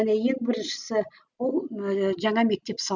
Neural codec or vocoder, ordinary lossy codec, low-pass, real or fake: none; none; 7.2 kHz; real